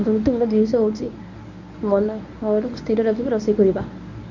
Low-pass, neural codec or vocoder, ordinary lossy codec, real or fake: 7.2 kHz; codec, 24 kHz, 0.9 kbps, WavTokenizer, medium speech release version 1; none; fake